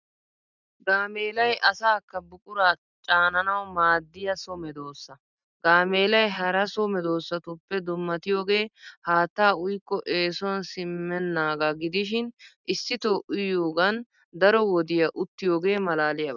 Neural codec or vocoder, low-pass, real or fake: none; 7.2 kHz; real